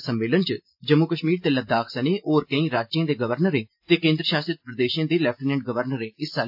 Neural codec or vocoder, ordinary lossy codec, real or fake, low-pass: none; AAC, 48 kbps; real; 5.4 kHz